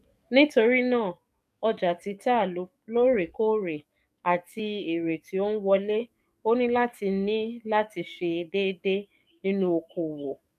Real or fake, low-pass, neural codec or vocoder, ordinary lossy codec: fake; 14.4 kHz; codec, 44.1 kHz, 7.8 kbps, DAC; none